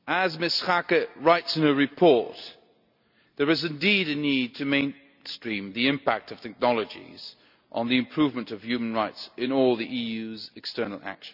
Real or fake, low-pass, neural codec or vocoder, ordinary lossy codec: real; 5.4 kHz; none; none